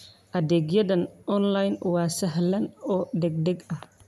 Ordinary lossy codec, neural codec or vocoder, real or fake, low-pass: none; none; real; 14.4 kHz